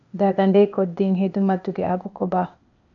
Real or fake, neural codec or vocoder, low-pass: fake; codec, 16 kHz, 0.8 kbps, ZipCodec; 7.2 kHz